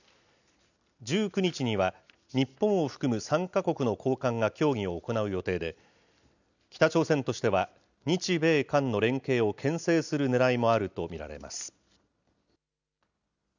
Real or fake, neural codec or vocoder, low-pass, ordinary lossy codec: real; none; 7.2 kHz; none